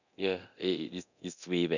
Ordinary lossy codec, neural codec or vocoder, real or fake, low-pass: none; codec, 24 kHz, 0.9 kbps, DualCodec; fake; 7.2 kHz